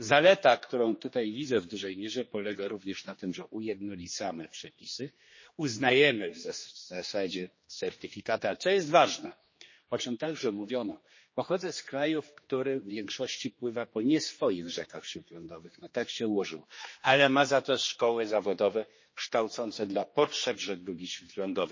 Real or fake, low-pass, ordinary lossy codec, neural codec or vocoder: fake; 7.2 kHz; MP3, 32 kbps; codec, 16 kHz, 2 kbps, X-Codec, HuBERT features, trained on general audio